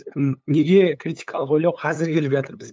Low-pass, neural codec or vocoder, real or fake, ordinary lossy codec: none; codec, 16 kHz, 8 kbps, FunCodec, trained on LibriTTS, 25 frames a second; fake; none